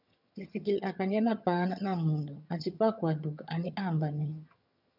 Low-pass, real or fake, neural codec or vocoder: 5.4 kHz; fake; vocoder, 22.05 kHz, 80 mel bands, HiFi-GAN